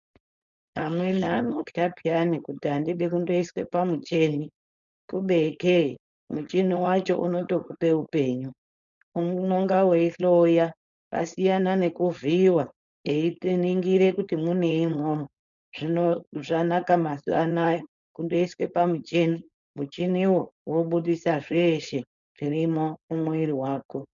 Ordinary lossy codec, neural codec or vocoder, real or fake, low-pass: MP3, 96 kbps; codec, 16 kHz, 4.8 kbps, FACodec; fake; 7.2 kHz